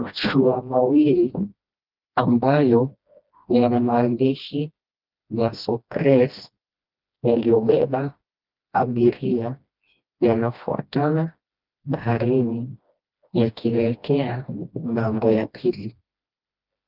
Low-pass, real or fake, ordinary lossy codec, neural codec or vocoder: 5.4 kHz; fake; Opus, 24 kbps; codec, 16 kHz, 1 kbps, FreqCodec, smaller model